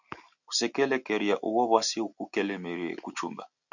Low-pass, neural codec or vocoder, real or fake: 7.2 kHz; none; real